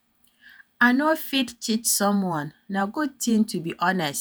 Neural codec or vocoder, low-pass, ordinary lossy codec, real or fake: vocoder, 48 kHz, 128 mel bands, Vocos; none; none; fake